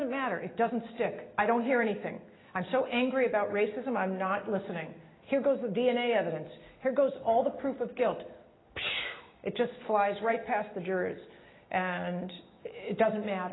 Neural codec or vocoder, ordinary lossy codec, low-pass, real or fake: none; AAC, 16 kbps; 7.2 kHz; real